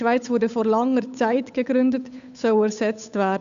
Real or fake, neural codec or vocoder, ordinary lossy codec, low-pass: fake; codec, 16 kHz, 8 kbps, FunCodec, trained on Chinese and English, 25 frames a second; none; 7.2 kHz